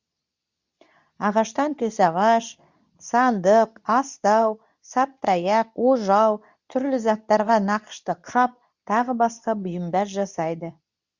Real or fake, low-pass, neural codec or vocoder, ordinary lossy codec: fake; 7.2 kHz; codec, 24 kHz, 0.9 kbps, WavTokenizer, medium speech release version 2; Opus, 64 kbps